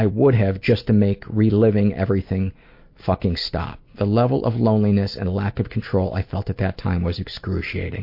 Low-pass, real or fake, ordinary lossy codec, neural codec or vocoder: 5.4 kHz; real; MP3, 32 kbps; none